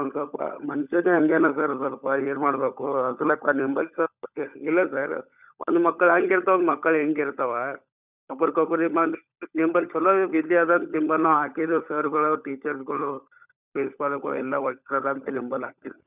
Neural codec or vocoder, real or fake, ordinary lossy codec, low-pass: codec, 16 kHz, 16 kbps, FunCodec, trained on LibriTTS, 50 frames a second; fake; none; 3.6 kHz